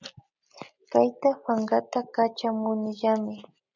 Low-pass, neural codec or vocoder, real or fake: 7.2 kHz; none; real